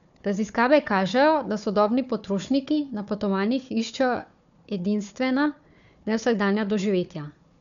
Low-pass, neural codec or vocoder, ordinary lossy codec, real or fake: 7.2 kHz; codec, 16 kHz, 4 kbps, FunCodec, trained on Chinese and English, 50 frames a second; none; fake